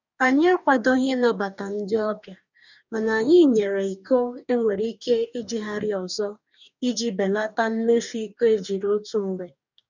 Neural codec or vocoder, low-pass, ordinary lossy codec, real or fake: codec, 44.1 kHz, 2.6 kbps, DAC; 7.2 kHz; none; fake